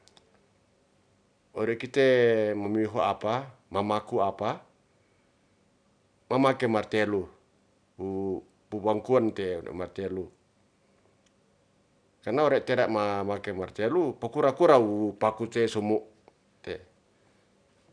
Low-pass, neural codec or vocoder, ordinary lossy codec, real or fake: 9.9 kHz; none; none; real